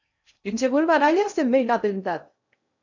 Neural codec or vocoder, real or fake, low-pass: codec, 16 kHz in and 24 kHz out, 0.6 kbps, FocalCodec, streaming, 2048 codes; fake; 7.2 kHz